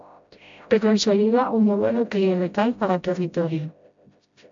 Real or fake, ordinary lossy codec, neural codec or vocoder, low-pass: fake; AAC, 64 kbps; codec, 16 kHz, 0.5 kbps, FreqCodec, smaller model; 7.2 kHz